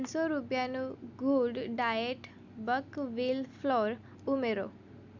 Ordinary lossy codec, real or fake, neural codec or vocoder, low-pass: none; real; none; 7.2 kHz